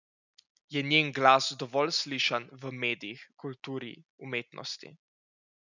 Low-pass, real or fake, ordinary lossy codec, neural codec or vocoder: 7.2 kHz; real; none; none